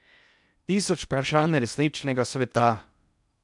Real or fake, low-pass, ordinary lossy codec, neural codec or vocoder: fake; 10.8 kHz; none; codec, 16 kHz in and 24 kHz out, 0.6 kbps, FocalCodec, streaming, 2048 codes